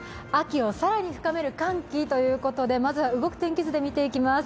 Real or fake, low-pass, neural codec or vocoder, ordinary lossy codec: real; none; none; none